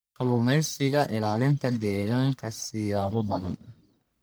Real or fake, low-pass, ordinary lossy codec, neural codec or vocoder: fake; none; none; codec, 44.1 kHz, 1.7 kbps, Pupu-Codec